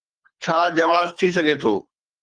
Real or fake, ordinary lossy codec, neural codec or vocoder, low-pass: fake; Opus, 32 kbps; codec, 24 kHz, 3 kbps, HILCodec; 9.9 kHz